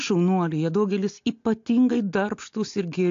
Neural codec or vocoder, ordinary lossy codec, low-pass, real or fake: none; AAC, 48 kbps; 7.2 kHz; real